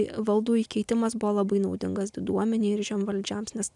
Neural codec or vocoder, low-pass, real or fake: none; 10.8 kHz; real